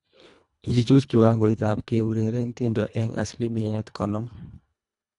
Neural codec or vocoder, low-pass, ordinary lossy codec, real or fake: codec, 24 kHz, 1.5 kbps, HILCodec; 10.8 kHz; none; fake